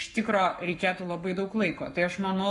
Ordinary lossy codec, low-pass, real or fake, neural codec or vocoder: Opus, 64 kbps; 10.8 kHz; fake; codec, 44.1 kHz, 7.8 kbps, Pupu-Codec